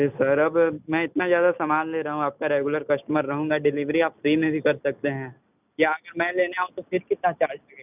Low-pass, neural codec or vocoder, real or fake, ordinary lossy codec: 3.6 kHz; none; real; none